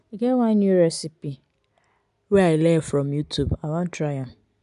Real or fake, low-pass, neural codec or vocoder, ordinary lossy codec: real; 10.8 kHz; none; none